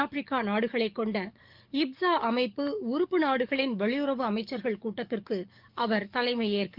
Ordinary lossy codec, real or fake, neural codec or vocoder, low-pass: Opus, 24 kbps; fake; codec, 44.1 kHz, 7.8 kbps, DAC; 5.4 kHz